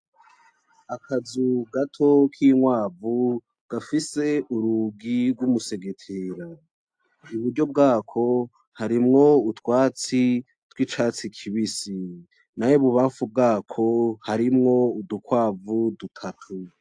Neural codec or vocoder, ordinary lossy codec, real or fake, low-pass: none; AAC, 64 kbps; real; 9.9 kHz